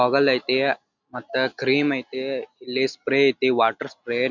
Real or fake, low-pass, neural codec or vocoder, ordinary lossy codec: real; 7.2 kHz; none; none